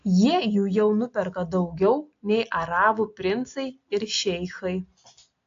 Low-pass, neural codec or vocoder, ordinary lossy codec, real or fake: 7.2 kHz; none; AAC, 48 kbps; real